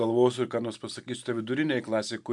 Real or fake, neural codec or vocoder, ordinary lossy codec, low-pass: real; none; MP3, 96 kbps; 10.8 kHz